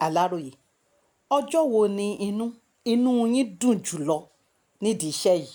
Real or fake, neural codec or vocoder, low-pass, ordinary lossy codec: real; none; none; none